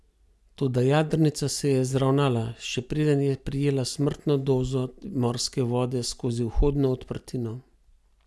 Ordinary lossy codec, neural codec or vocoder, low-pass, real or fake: none; none; none; real